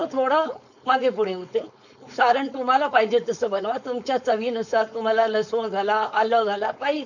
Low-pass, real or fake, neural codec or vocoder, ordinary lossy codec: 7.2 kHz; fake; codec, 16 kHz, 4.8 kbps, FACodec; none